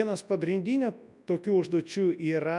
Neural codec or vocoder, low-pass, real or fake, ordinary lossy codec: codec, 24 kHz, 0.9 kbps, WavTokenizer, large speech release; 10.8 kHz; fake; MP3, 96 kbps